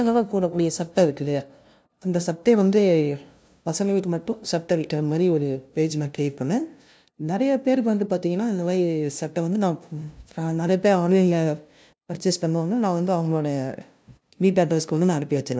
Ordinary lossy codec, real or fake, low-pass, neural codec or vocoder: none; fake; none; codec, 16 kHz, 0.5 kbps, FunCodec, trained on LibriTTS, 25 frames a second